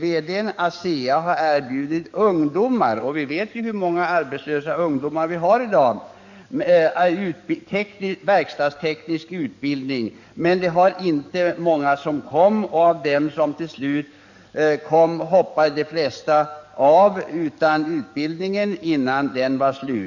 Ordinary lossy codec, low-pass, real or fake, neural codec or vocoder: none; 7.2 kHz; fake; codec, 44.1 kHz, 7.8 kbps, DAC